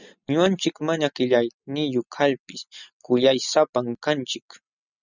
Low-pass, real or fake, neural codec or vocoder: 7.2 kHz; real; none